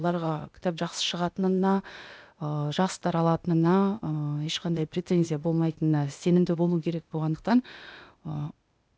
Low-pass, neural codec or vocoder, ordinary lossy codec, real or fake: none; codec, 16 kHz, 0.8 kbps, ZipCodec; none; fake